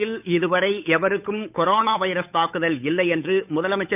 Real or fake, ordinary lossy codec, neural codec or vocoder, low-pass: fake; none; codec, 16 kHz, 8 kbps, FunCodec, trained on Chinese and English, 25 frames a second; 3.6 kHz